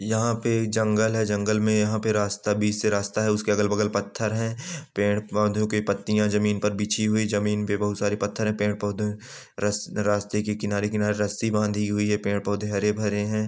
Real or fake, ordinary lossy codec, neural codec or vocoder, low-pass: real; none; none; none